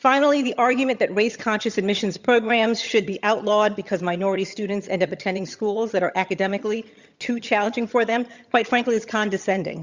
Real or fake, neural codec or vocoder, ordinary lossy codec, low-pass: fake; vocoder, 22.05 kHz, 80 mel bands, HiFi-GAN; Opus, 64 kbps; 7.2 kHz